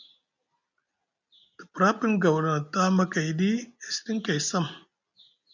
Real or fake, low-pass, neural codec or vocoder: real; 7.2 kHz; none